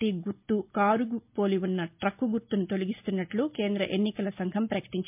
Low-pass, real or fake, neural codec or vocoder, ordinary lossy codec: 3.6 kHz; real; none; MP3, 32 kbps